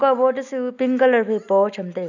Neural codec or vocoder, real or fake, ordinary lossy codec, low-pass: none; real; none; 7.2 kHz